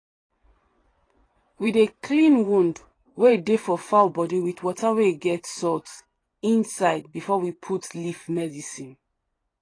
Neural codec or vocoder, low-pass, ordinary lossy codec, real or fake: vocoder, 24 kHz, 100 mel bands, Vocos; 9.9 kHz; AAC, 32 kbps; fake